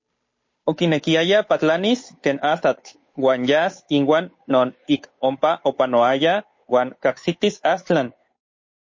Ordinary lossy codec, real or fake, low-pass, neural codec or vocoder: MP3, 32 kbps; fake; 7.2 kHz; codec, 16 kHz, 8 kbps, FunCodec, trained on Chinese and English, 25 frames a second